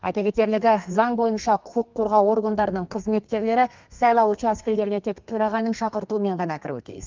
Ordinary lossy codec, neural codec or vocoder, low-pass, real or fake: Opus, 24 kbps; codec, 32 kHz, 1.9 kbps, SNAC; 7.2 kHz; fake